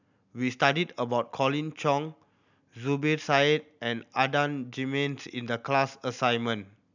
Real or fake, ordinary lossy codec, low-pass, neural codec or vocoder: real; none; 7.2 kHz; none